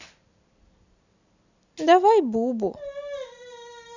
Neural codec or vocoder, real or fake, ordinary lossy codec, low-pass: none; real; none; 7.2 kHz